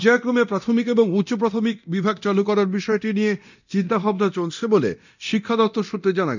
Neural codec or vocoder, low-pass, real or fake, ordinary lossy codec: codec, 24 kHz, 0.9 kbps, DualCodec; 7.2 kHz; fake; none